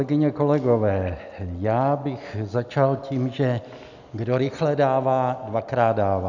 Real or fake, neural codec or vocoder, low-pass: real; none; 7.2 kHz